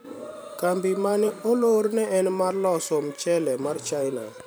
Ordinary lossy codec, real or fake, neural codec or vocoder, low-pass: none; fake; vocoder, 44.1 kHz, 128 mel bands every 512 samples, BigVGAN v2; none